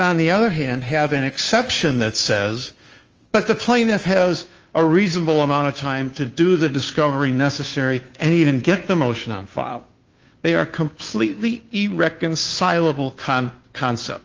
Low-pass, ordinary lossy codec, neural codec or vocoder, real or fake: 7.2 kHz; Opus, 24 kbps; codec, 16 kHz, 2 kbps, FunCodec, trained on Chinese and English, 25 frames a second; fake